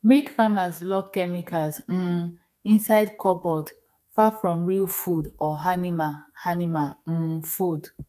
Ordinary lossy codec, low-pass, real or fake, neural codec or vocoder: none; 14.4 kHz; fake; codec, 32 kHz, 1.9 kbps, SNAC